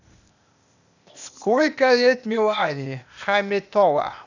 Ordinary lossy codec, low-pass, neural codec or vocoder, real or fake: none; 7.2 kHz; codec, 16 kHz, 0.8 kbps, ZipCodec; fake